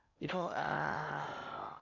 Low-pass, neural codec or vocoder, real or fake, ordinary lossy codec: 7.2 kHz; codec, 16 kHz in and 24 kHz out, 0.8 kbps, FocalCodec, streaming, 65536 codes; fake; none